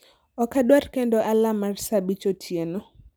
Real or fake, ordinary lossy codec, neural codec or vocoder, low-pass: real; none; none; none